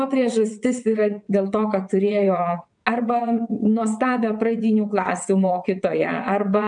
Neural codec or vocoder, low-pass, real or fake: vocoder, 22.05 kHz, 80 mel bands, WaveNeXt; 9.9 kHz; fake